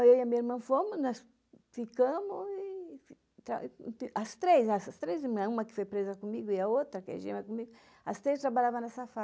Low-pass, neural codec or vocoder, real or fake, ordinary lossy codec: none; none; real; none